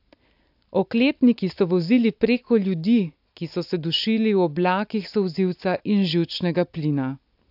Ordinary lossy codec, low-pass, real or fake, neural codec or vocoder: AAC, 48 kbps; 5.4 kHz; real; none